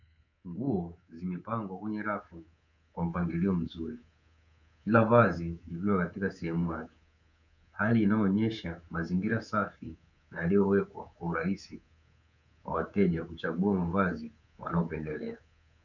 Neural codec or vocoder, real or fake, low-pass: codec, 24 kHz, 3.1 kbps, DualCodec; fake; 7.2 kHz